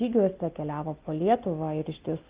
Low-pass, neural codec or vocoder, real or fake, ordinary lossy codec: 3.6 kHz; none; real; Opus, 32 kbps